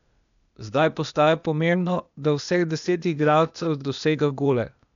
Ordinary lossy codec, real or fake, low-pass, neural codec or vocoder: none; fake; 7.2 kHz; codec, 16 kHz, 0.8 kbps, ZipCodec